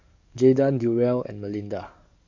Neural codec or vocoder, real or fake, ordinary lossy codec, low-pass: codec, 44.1 kHz, 7.8 kbps, DAC; fake; MP3, 48 kbps; 7.2 kHz